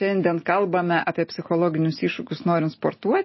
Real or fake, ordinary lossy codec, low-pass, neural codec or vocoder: real; MP3, 24 kbps; 7.2 kHz; none